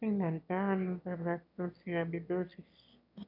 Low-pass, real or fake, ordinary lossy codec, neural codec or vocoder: 5.4 kHz; fake; none; autoencoder, 22.05 kHz, a latent of 192 numbers a frame, VITS, trained on one speaker